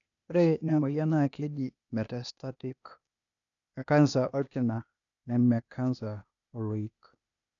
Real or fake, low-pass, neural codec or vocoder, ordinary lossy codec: fake; 7.2 kHz; codec, 16 kHz, 0.8 kbps, ZipCodec; none